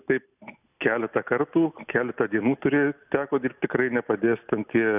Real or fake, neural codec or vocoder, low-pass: real; none; 3.6 kHz